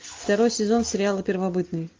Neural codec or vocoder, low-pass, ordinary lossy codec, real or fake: none; 7.2 kHz; Opus, 24 kbps; real